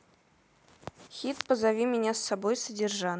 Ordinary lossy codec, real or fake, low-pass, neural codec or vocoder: none; real; none; none